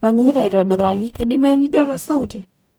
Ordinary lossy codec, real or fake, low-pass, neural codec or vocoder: none; fake; none; codec, 44.1 kHz, 0.9 kbps, DAC